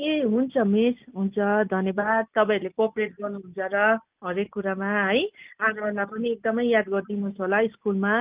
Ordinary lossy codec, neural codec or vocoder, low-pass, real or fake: Opus, 24 kbps; none; 3.6 kHz; real